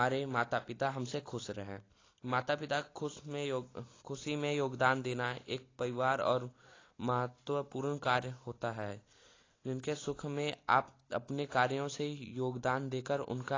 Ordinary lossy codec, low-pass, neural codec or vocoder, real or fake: AAC, 32 kbps; 7.2 kHz; none; real